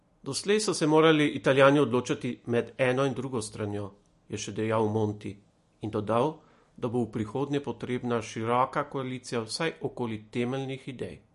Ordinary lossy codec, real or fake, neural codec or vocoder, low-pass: MP3, 48 kbps; real; none; 14.4 kHz